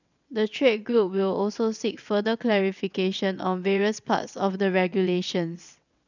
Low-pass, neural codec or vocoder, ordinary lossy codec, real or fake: 7.2 kHz; vocoder, 22.05 kHz, 80 mel bands, WaveNeXt; none; fake